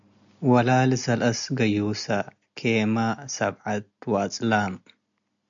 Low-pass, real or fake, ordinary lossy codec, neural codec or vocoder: 7.2 kHz; real; MP3, 64 kbps; none